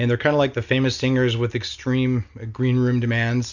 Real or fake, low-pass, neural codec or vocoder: real; 7.2 kHz; none